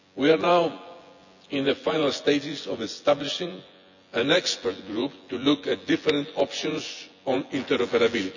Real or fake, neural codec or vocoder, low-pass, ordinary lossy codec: fake; vocoder, 24 kHz, 100 mel bands, Vocos; 7.2 kHz; none